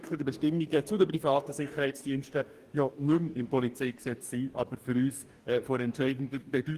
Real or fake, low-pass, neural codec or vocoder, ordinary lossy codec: fake; 14.4 kHz; codec, 44.1 kHz, 2.6 kbps, DAC; Opus, 24 kbps